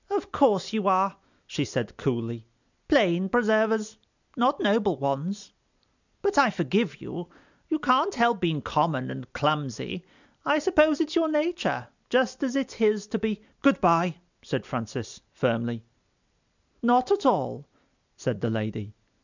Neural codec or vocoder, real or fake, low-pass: none; real; 7.2 kHz